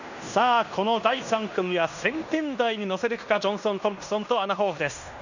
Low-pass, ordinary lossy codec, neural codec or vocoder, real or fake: 7.2 kHz; none; codec, 16 kHz in and 24 kHz out, 0.9 kbps, LongCat-Audio-Codec, fine tuned four codebook decoder; fake